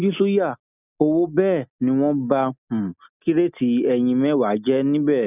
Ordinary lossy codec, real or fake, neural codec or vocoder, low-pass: none; real; none; 3.6 kHz